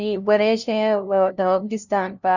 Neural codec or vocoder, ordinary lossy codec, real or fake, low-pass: codec, 16 kHz, 0.5 kbps, FunCodec, trained on LibriTTS, 25 frames a second; none; fake; 7.2 kHz